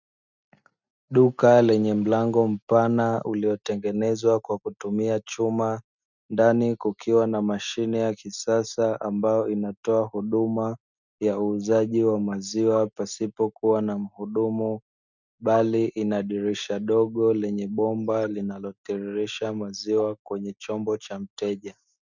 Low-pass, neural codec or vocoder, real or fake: 7.2 kHz; none; real